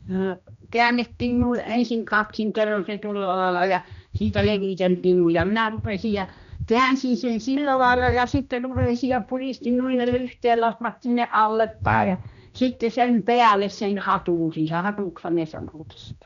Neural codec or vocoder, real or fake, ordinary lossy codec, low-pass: codec, 16 kHz, 1 kbps, X-Codec, HuBERT features, trained on general audio; fake; none; 7.2 kHz